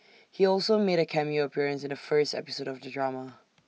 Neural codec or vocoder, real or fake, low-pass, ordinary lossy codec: none; real; none; none